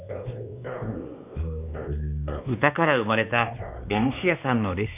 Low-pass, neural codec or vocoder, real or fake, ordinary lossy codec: 3.6 kHz; codec, 16 kHz, 2 kbps, X-Codec, WavLM features, trained on Multilingual LibriSpeech; fake; none